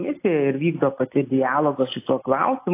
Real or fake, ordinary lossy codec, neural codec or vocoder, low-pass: real; AAC, 24 kbps; none; 3.6 kHz